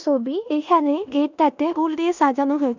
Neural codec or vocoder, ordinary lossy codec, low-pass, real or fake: codec, 16 kHz in and 24 kHz out, 0.9 kbps, LongCat-Audio-Codec, four codebook decoder; none; 7.2 kHz; fake